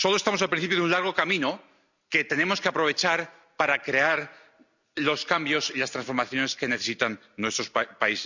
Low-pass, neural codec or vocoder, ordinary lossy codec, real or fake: 7.2 kHz; none; none; real